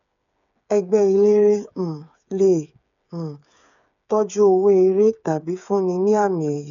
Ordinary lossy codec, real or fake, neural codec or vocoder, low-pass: none; fake; codec, 16 kHz, 8 kbps, FreqCodec, smaller model; 7.2 kHz